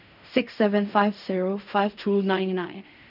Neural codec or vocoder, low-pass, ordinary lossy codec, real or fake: codec, 16 kHz in and 24 kHz out, 0.4 kbps, LongCat-Audio-Codec, fine tuned four codebook decoder; 5.4 kHz; none; fake